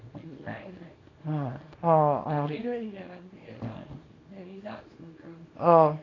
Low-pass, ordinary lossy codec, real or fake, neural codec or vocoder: 7.2 kHz; AAC, 32 kbps; fake; codec, 24 kHz, 0.9 kbps, WavTokenizer, small release